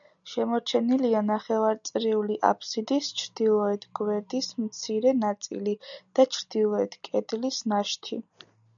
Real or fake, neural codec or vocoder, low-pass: real; none; 7.2 kHz